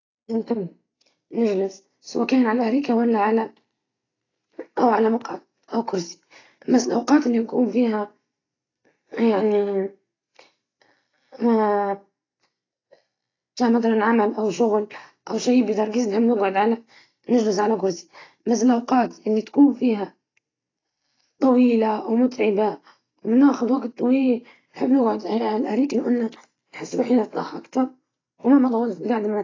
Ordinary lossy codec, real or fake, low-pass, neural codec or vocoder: AAC, 32 kbps; real; 7.2 kHz; none